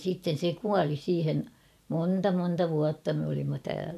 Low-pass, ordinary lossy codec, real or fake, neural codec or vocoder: 14.4 kHz; none; fake; vocoder, 44.1 kHz, 128 mel bands every 512 samples, BigVGAN v2